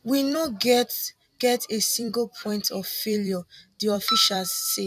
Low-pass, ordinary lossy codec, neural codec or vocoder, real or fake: 14.4 kHz; none; vocoder, 44.1 kHz, 128 mel bands every 256 samples, BigVGAN v2; fake